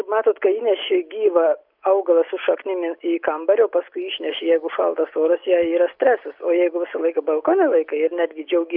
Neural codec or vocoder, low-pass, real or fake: none; 5.4 kHz; real